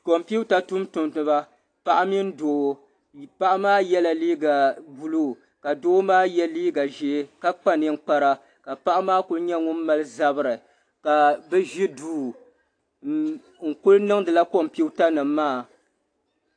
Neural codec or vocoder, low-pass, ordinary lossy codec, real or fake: none; 9.9 kHz; AAC, 48 kbps; real